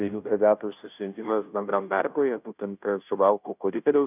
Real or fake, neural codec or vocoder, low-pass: fake; codec, 16 kHz, 0.5 kbps, FunCodec, trained on Chinese and English, 25 frames a second; 3.6 kHz